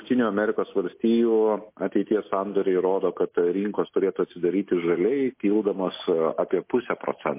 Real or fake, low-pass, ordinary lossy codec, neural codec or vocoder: real; 3.6 kHz; MP3, 24 kbps; none